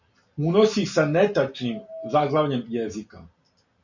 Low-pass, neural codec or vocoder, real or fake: 7.2 kHz; none; real